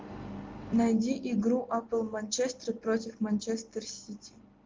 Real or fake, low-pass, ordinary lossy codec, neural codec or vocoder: real; 7.2 kHz; Opus, 32 kbps; none